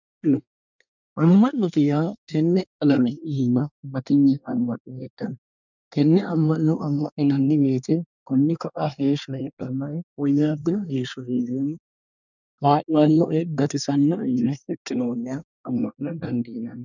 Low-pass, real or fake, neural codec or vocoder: 7.2 kHz; fake; codec, 24 kHz, 1 kbps, SNAC